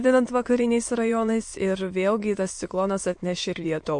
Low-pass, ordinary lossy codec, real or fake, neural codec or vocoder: 9.9 kHz; MP3, 48 kbps; fake; autoencoder, 22.05 kHz, a latent of 192 numbers a frame, VITS, trained on many speakers